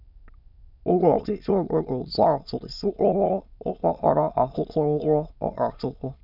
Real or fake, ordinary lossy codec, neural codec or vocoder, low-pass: fake; none; autoencoder, 22.05 kHz, a latent of 192 numbers a frame, VITS, trained on many speakers; 5.4 kHz